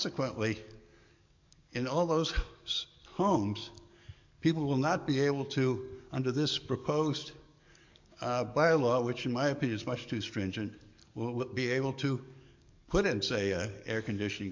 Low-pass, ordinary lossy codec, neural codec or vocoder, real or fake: 7.2 kHz; MP3, 48 kbps; codec, 44.1 kHz, 7.8 kbps, DAC; fake